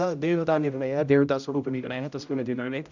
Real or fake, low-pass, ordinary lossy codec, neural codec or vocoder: fake; 7.2 kHz; none; codec, 16 kHz, 0.5 kbps, X-Codec, HuBERT features, trained on general audio